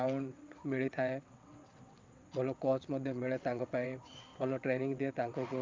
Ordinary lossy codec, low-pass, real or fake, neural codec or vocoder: Opus, 24 kbps; 7.2 kHz; fake; vocoder, 22.05 kHz, 80 mel bands, WaveNeXt